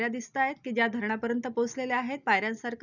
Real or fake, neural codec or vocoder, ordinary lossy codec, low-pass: real; none; none; 7.2 kHz